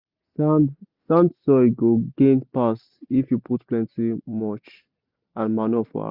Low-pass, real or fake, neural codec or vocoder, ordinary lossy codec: 5.4 kHz; real; none; Opus, 64 kbps